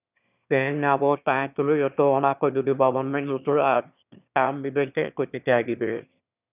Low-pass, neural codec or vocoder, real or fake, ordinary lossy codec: 3.6 kHz; autoencoder, 22.05 kHz, a latent of 192 numbers a frame, VITS, trained on one speaker; fake; none